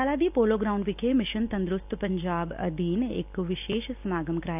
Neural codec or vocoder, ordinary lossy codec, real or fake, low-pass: none; none; real; 3.6 kHz